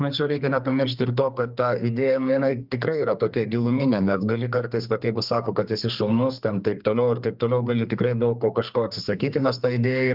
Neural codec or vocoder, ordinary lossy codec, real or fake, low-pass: codec, 32 kHz, 1.9 kbps, SNAC; Opus, 24 kbps; fake; 5.4 kHz